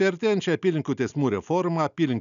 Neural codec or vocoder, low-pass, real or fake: none; 7.2 kHz; real